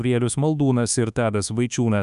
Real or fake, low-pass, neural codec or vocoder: fake; 10.8 kHz; codec, 24 kHz, 1.2 kbps, DualCodec